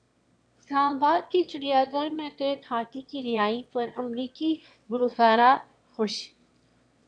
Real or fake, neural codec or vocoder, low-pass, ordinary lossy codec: fake; autoencoder, 22.05 kHz, a latent of 192 numbers a frame, VITS, trained on one speaker; 9.9 kHz; AAC, 64 kbps